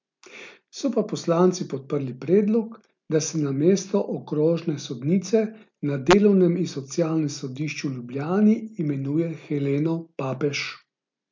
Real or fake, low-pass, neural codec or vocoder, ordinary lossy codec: real; 7.2 kHz; none; MP3, 64 kbps